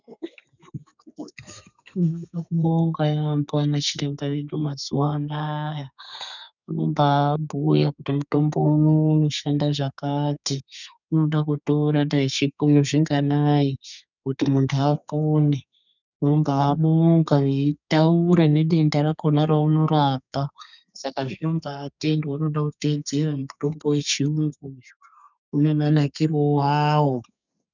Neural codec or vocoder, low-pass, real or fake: codec, 32 kHz, 1.9 kbps, SNAC; 7.2 kHz; fake